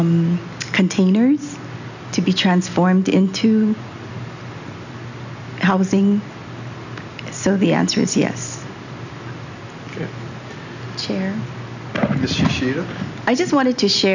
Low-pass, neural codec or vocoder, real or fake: 7.2 kHz; none; real